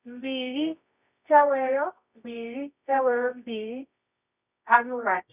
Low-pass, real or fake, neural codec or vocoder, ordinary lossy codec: 3.6 kHz; fake; codec, 24 kHz, 0.9 kbps, WavTokenizer, medium music audio release; none